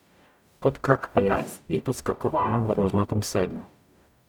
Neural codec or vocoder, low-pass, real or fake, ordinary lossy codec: codec, 44.1 kHz, 0.9 kbps, DAC; 19.8 kHz; fake; none